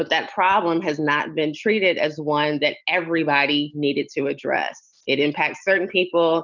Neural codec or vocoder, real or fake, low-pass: none; real; 7.2 kHz